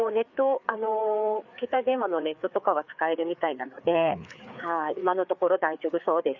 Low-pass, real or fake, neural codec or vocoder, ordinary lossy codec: 7.2 kHz; fake; codec, 16 kHz, 4 kbps, FreqCodec, larger model; none